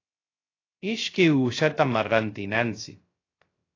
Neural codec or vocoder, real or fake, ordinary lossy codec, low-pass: codec, 16 kHz, 0.3 kbps, FocalCodec; fake; AAC, 32 kbps; 7.2 kHz